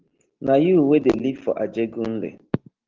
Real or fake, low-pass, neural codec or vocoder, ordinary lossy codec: real; 7.2 kHz; none; Opus, 16 kbps